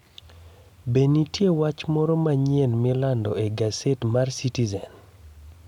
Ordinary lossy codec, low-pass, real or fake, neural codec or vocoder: none; 19.8 kHz; real; none